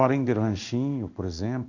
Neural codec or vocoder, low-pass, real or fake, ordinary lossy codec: codec, 16 kHz in and 24 kHz out, 1 kbps, XY-Tokenizer; 7.2 kHz; fake; none